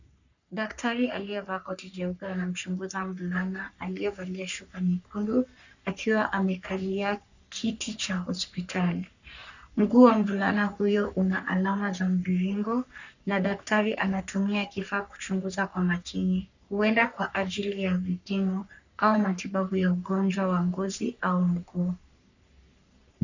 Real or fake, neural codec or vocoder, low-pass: fake; codec, 44.1 kHz, 3.4 kbps, Pupu-Codec; 7.2 kHz